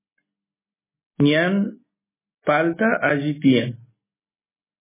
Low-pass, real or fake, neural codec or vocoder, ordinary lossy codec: 3.6 kHz; real; none; MP3, 16 kbps